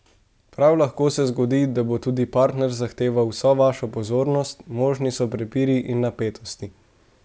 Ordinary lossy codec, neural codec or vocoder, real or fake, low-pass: none; none; real; none